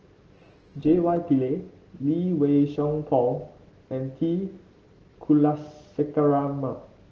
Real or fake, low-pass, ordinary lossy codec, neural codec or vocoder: real; 7.2 kHz; Opus, 16 kbps; none